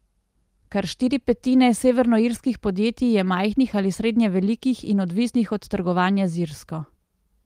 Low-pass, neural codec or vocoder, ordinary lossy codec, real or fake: 14.4 kHz; none; Opus, 24 kbps; real